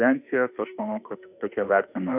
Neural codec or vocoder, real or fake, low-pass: autoencoder, 48 kHz, 32 numbers a frame, DAC-VAE, trained on Japanese speech; fake; 3.6 kHz